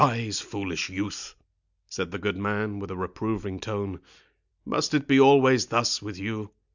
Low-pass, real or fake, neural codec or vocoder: 7.2 kHz; real; none